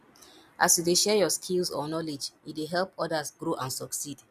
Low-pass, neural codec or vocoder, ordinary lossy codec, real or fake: 14.4 kHz; none; none; real